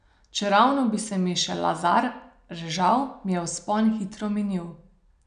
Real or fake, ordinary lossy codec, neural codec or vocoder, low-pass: real; none; none; 9.9 kHz